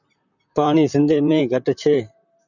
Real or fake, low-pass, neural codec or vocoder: fake; 7.2 kHz; vocoder, 44.1 kHz, 128 mel bands, Pupu-Vocoder